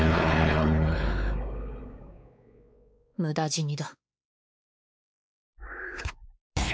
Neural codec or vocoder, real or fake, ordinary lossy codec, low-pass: codec, 16 kHz, 4 kbps, X-Codec, WavLM features, trained on Multilingual LibriSpeech; fake; none; none